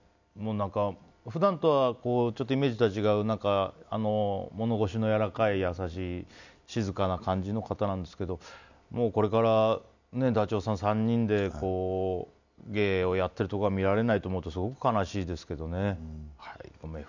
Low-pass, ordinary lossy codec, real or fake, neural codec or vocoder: 7.2 kHz; none; real; none